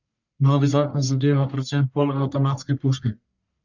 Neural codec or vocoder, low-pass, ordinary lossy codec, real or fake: codec, 44.1 kHz, 1.7 kbps, Pupu-Codec; 7.2 kHz; none; fake